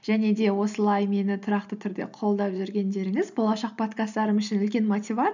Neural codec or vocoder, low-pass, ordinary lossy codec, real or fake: none; 7.2 kHz; none; real